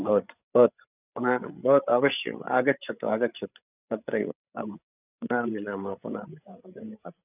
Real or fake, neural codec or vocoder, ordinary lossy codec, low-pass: fake; codec, 16 kHz, 8 kbps, FreqCodec, larger model; none; 3.6 kHz